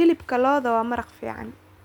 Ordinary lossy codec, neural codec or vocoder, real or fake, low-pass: none; none; real; 19.8 kHz